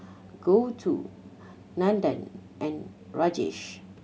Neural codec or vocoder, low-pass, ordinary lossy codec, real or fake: none; none; none; real